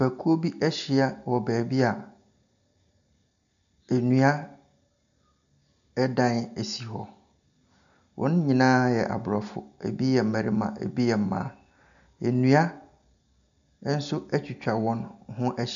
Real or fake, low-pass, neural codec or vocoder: real; 7.2 kHz; none